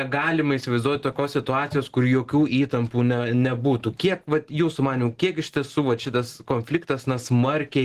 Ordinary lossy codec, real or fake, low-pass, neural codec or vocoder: Opus, 16 kbps; real; 14.4 kHz; none